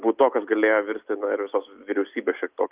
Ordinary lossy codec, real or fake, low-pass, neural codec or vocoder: Opus, 24 kbps; real; 3.6 kHz; none